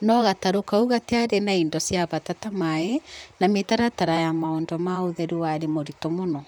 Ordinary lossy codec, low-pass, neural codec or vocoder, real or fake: none; 19.8 kHz; vocoder, 44.1 kHz, 128 mel bands, Pupu-Vocoder; fake